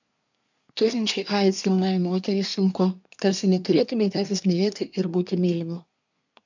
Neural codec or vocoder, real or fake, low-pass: codec, 24 kHz, 1 kbps, SNAC; fake; 7.2 kHz